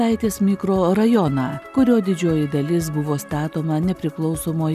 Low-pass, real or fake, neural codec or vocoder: 14.4 kHz; real; none